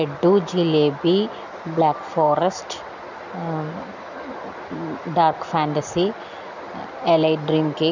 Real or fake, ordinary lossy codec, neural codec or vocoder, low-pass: real; none; none; 7.2 kHz